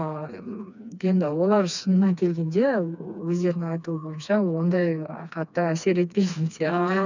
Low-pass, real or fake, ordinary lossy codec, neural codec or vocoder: 7.2 kHz; fake; none; codec, 16 kHz, 2 kbps, FreqCodec, smaller model